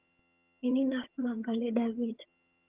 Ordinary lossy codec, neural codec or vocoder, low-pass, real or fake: Opus, 24 kbps; vocoder, 22.05 kHz, 80 mel bands, HiFi-GAN; 3.6 kHz; fake